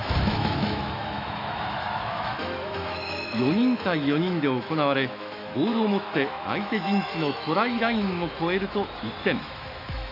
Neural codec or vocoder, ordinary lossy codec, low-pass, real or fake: autoencoder, 48 kHz, 128 numbers a frame, DAC-VAE, trained on Japanese speech; AAC, 32 kbps; 5.4 kHz; fake